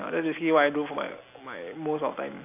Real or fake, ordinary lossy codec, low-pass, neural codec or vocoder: real; AAC, 24 kbps; 3.6 kHz; none